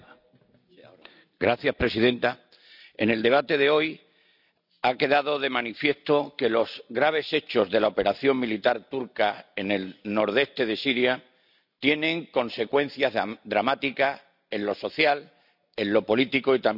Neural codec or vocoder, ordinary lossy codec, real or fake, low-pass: none; none; real; 5.4 kHz